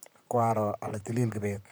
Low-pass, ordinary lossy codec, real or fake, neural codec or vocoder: none; none; fake; vocoder, 44.1 kHz, 128 mel bands, Pupu-Vocoder